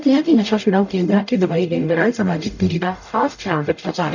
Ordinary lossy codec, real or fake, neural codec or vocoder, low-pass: none; fake; codec, 44.1 kHz, 0.9 kbps, DAC; 7.2 kHz